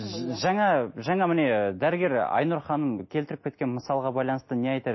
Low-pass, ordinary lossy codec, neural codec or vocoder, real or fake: 7.2 kHz; MP3, 24 kbps; none; real